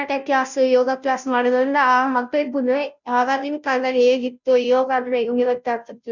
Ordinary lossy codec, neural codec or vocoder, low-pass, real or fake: none; codec, 16 kHz, 0.5 kbps, FunCodec, trained on Chinese and English, 25 frames a second; 7.2 kHz; fake